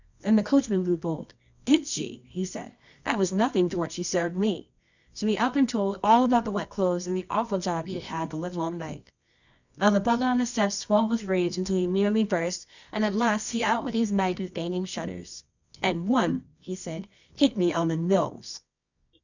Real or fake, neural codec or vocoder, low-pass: fake; codec, 24 kHz, 0.9 kbps, WavTokenizer, medium music audio release; 7.2 kHz